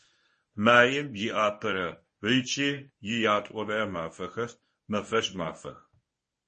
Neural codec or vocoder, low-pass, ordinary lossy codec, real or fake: codec, 24 kHz, 0.9 kbps, WavTokenizer, medium speech release version 1; 10.8 kHz; MP3, 32 kbps; fake